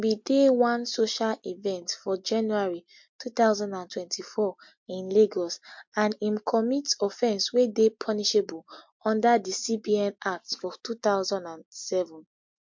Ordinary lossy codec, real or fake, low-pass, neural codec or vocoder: MP3, 48 kbps; real; 7.2 kHz; none